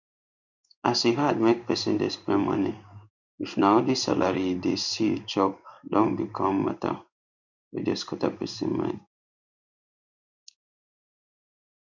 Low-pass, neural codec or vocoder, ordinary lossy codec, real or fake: 7.2 kHz; codec, 16 kHz in and 24 kHz out, 1 kbps, XY-Tokenizer; none; fake